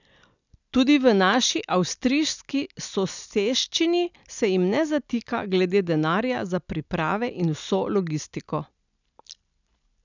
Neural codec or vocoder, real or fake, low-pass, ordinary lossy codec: none; real; 7.2 kHz; none